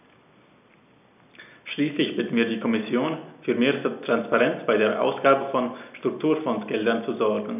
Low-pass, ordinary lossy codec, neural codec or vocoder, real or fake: 3.6 kHz; none; none; real